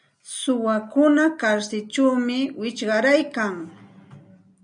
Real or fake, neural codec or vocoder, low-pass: real; none; 9.9 kHz